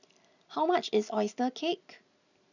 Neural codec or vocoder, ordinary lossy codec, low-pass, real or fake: none; none; 7.2 kHz; real